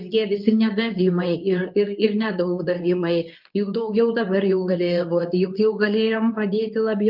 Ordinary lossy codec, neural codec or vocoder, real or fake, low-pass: Opus, 24 kbps; codec, 24 kHz, 0.9 kbps, WavTokenizer, medium speech release version 2; fake; 5.4 kHz